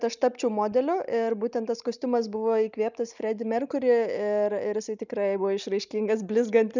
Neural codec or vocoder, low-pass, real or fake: none; 7.2 kHz; real